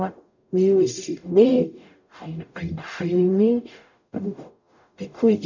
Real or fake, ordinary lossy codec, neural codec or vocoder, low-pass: fake; none; codec, 44.1 kHz, 0.9 kbps, DAC; 7.2 kHz